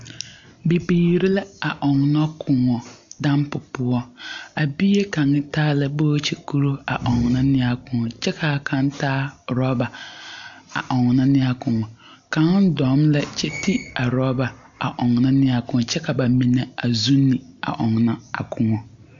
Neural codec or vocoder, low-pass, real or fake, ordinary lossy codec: none; 7.2 kHz; real; MP3, 64 kbps